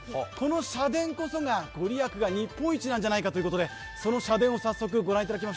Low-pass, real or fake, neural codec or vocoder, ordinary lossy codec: none; real; none; none